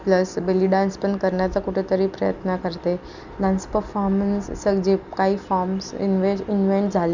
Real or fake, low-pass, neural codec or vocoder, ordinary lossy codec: real; 7.2 kHz; none; none